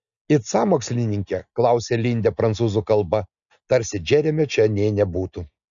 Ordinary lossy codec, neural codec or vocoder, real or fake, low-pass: MP3, 96 kbps; none; real; 7.2 kHz